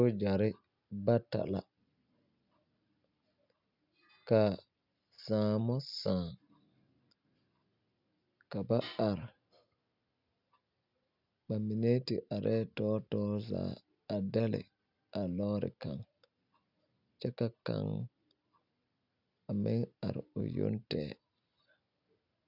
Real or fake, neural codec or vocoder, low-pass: real; none; 5.4 kHz